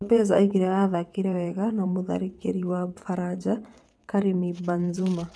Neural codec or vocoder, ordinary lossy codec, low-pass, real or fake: vocoder, 22.05 kHz, 80 mel bands, WaveNeXt; none; none; fake